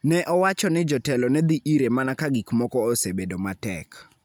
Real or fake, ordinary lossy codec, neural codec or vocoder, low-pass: real; none; none; none